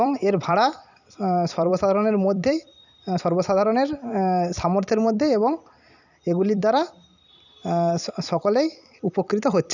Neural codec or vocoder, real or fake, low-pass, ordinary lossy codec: vocoder, 44.1 kHz, 128 mel bands every 256 samples, BigVGAN v2; fake; 7.2 kHz; none